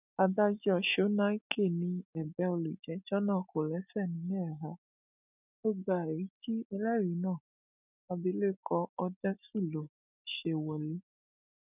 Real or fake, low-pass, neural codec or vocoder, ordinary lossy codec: real; 3.6 kHz; none; none